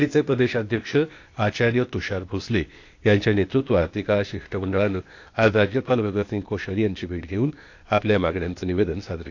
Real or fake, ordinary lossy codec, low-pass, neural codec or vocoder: fake; AAC, 48 kbps; 7.2 kHz; codec, 16 kHz, 0.8 kbps, ZipCodec